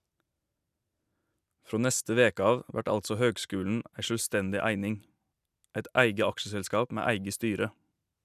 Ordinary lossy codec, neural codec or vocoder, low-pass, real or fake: none; none; 14.4 kHz; real